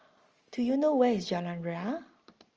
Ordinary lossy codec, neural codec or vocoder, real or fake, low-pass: Opus, 24 kbps; none; real; 7.2 kHz